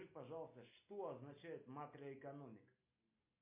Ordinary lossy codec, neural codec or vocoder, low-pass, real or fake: MP3, 24 kbps; none; 3.6 kHz; real